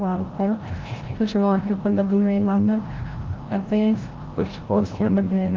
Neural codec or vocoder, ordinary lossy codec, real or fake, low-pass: codec, 16 kHz, 0.5 kbps, FreqCodec, larger model; Opus, 16 kbps; fake; 7.2 kHz